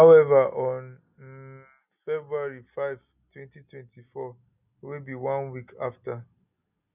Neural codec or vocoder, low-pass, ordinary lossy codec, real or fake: none; 3.6 kHz; none; real